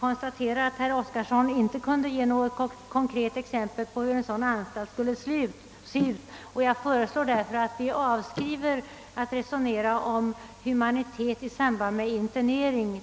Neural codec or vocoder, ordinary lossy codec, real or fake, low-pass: none; none; real; none